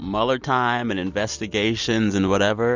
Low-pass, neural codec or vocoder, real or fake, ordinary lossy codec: 7.2 kHz; none; real; Opus, 64 kbps